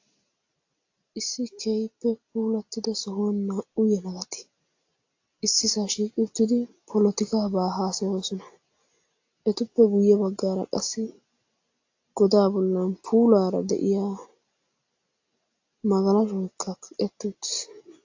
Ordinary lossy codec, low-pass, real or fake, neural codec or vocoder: AAC, 48 kbps; 7.2 kHz; real; none